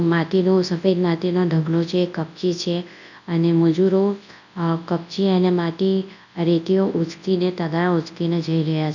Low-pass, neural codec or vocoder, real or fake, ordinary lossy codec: 7.2 kHz; codec, 24 kHz, 0.9 kbps, WavTokenizer, large speech release; fake; none